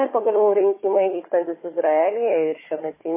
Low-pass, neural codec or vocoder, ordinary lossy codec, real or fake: 3.6 kHz; codec, 16 kHz, 4 kbps, FunCodec, trained on Chinese and English, 50 frames a second; MP3, 16 kbps; fake